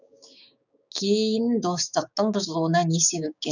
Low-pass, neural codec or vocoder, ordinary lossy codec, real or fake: 7.2 kHz; codec, 16 kHz, 6 kbps, DAC; none; fake